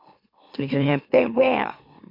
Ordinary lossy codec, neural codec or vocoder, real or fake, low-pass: MP3, 32 kbps; autoencoder, 44.1 kHz, a latent of 192 numbers a frame, MeloTTS; fake; 5.4 kHz